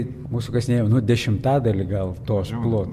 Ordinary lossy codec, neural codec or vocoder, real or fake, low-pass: MP3, 64 kbps; none; real; 14.4 kHz